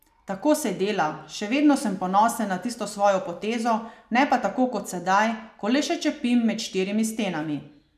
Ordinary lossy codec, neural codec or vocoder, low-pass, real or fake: none; none; 14.4 kHz; real